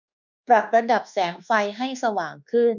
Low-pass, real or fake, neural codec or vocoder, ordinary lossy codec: 7.2 kHz; fake; codec, 24 kHz, 1.2 kbps, DualCodec; none